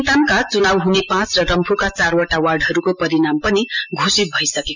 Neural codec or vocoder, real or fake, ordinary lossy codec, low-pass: none; real; none; 7.2 kHz